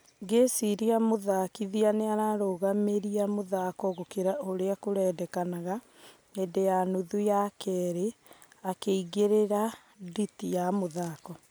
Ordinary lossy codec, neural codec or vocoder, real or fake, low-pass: none; none; real; none